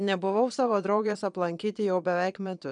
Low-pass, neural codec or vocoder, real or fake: 9.9 kHz; vocoder, 22.05 kHz, 80 mel bands, Vocos; fake